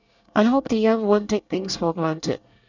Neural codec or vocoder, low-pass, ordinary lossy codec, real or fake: codec, 24 kHz, 1 kbps, SNAC; 7.2 kHz; none; fake